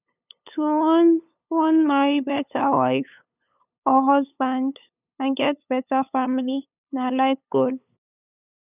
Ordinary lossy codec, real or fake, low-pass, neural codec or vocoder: none; fake; 3.6 kHz; codec, 16 kHz, 8 kbps, FunCodec, trained on LibriTTS, 25 frames a second